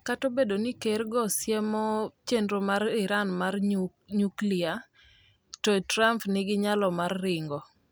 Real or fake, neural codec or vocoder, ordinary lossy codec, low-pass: real; none; none; none